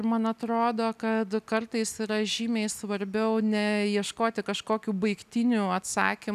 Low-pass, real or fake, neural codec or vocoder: 14.4 kHz; real; none